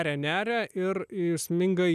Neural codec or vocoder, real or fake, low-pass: none; real; 14.4 kHz